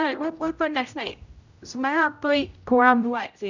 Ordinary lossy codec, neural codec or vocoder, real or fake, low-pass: none; codec, 16 kHz, 0.5 kbps, X-Codec, HuBERT features, trained on general audio; fake; 7.2 kHz